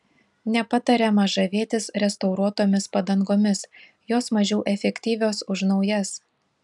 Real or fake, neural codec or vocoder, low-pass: real; none; 10.8 kHz